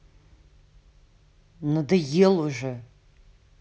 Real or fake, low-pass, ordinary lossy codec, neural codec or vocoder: real; none; none; none